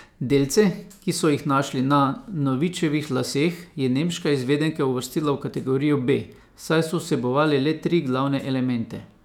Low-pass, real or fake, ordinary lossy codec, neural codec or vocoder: 19.8 kHz; fake; none; autoencoder, 48 kHz, 128 numbers a frame, DAC-VAE, trained on Japanese speech